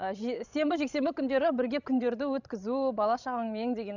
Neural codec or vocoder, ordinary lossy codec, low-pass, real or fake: codec, 16 kHz, 16 kbps, FreqCodec, larger model; none; 7.2 kHz; fake